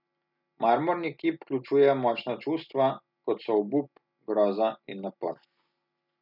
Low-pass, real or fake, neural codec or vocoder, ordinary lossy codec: 5.4 kHz; real; none; none